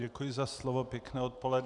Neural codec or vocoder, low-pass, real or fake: none; 10.8 kHz; real